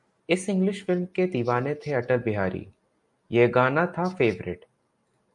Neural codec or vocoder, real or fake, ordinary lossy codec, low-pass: none; real; MP3, 96 kbps; 10.8 kHz